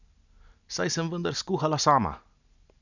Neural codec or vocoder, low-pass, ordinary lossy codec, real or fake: none; 7.2 kHz; none; real